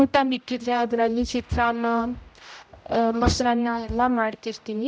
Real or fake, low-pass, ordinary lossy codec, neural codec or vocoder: fake; none; none; codec, 16 kHz, 0.5 kbps, X-Codec, HuBERT features, trained on general audio